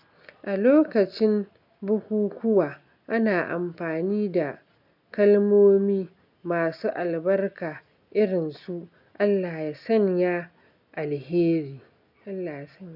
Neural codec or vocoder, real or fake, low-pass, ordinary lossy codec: none; real; 5.4 kHz; none